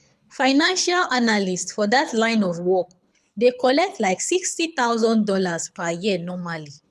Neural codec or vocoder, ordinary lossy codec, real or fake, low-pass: codec, 24 kHz, 6 kbps, HILCodec; none; fake; none